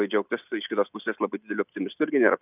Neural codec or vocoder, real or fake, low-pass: none; real; 3.6 kHz